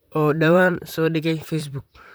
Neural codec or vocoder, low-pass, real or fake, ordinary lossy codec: vocoder, 44.1 kHz, 128 mel bands, Pupu-Vocoder; none; fake; none